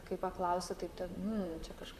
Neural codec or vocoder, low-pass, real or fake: vocoder, 44.1 kHz, 128 mel bands, Pupu-Vocoder; 14.4 kHz; fake